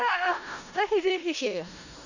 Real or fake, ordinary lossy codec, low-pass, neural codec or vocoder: fake; none; 7.2 kHz; codec, 16 kHz in and 24 kHz out, 0.4 kbps, LongCat-Audio-Codec, four codebook decoder